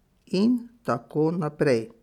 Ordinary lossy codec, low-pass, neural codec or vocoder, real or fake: none; 19.8 kHz; none; real